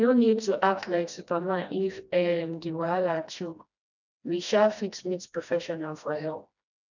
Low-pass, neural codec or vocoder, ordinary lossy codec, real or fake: 7.2 kHz; codec, 16 kHz, 1 kbps, FreqCodec, smaller model; none; fake